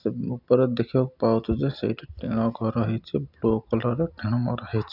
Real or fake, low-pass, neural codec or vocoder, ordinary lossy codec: real; 5.4 kHz; none; none